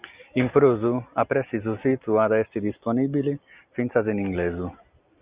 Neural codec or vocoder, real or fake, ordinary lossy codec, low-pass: none; real; Opus, 64 kbps; 3.6 kHz